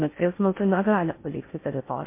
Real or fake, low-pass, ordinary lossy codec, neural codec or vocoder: fake; 3.6 kHz; MP3, 24 kbps; codec, 16 kHz in and 24 kHz out, 0.6 kbps, FocalCodec, streaming, 4096 codes